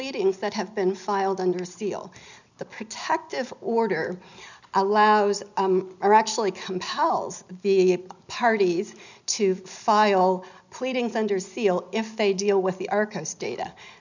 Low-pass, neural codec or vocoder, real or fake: 7.2 kHz; none; real